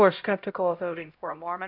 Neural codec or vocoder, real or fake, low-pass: codec, 16 kHz, 0.5 kbps, X-Codec, HuBERT features, trained on LibriSpeech; fake; 5.4 kHz